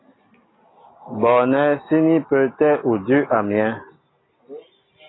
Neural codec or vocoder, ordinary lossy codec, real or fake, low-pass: none; AAC, 16 kbps; real; 7.2 kHz